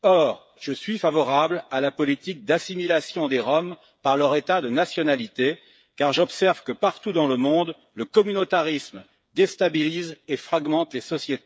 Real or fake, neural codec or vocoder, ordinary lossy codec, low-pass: fake; codec, 16 kHz, 8 kbps, FreqCodec, smaller model; none; none